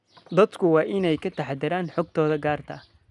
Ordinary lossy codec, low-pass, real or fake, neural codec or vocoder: none; 10.8 kHz; real; none